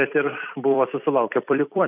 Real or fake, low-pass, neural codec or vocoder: fake; 3.6 kHz; vocoder, 44.1 kHz, 128 mel bands every 256 samples, BigVGAN v2